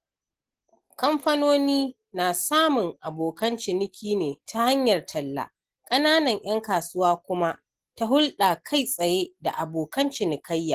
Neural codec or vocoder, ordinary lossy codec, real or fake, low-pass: none; Opus, 16 kbps; real; 14.4 kHz